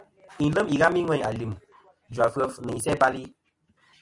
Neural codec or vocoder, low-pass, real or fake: none; 10.8 kHz; real